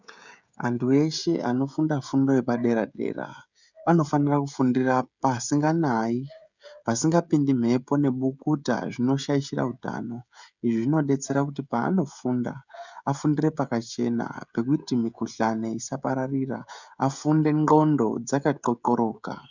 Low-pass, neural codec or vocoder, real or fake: 7.2 kHz; codec, 16 kHz, 16 kbps, FreqCodec, smaller model; fake